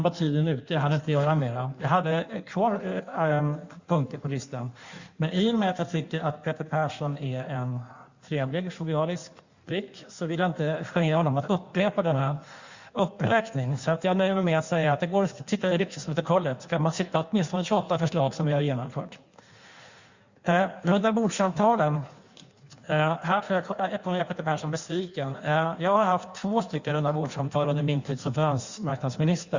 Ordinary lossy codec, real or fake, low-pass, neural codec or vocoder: Opus, 64 kbps; fake; 7.2 kHz; codec, 16 kHz in and 24 kHz out, 1.1 kbps, FireRedTTS-2 codec